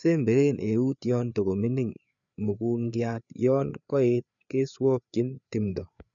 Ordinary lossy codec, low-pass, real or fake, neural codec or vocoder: none; 7.2 kHz; fake; codec, 16 kHz, 4 kbps, FreqCodec, larger model